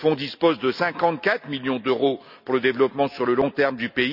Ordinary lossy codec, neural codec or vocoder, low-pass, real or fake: none; none; 5.4 kHz; real